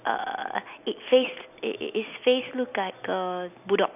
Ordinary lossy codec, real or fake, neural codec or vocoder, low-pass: none; real; none; 3.6 kHz